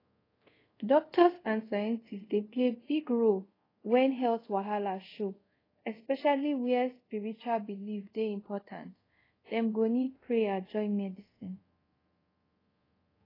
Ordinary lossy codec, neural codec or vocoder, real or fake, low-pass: AAC, 24 kbps; codec, 24 kHz, 0.5 kbps, DualCodec; fake; 5.4 kHz